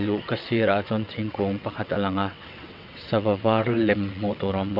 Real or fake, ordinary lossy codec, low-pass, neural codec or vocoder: fake; none; 5.4 kHz; vocoder, 22.05 kHz, 80 mel bands, WaveNeXt